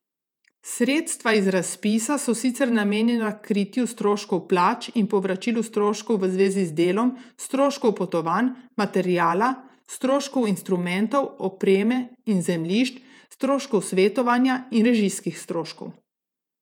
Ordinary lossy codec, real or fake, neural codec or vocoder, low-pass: none; fake; vocoder, 48 kHz, 128 mel bands, Vocos; 19.8 kHz